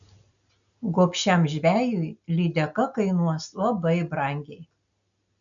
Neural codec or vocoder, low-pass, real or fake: none; 7.2 kHz; real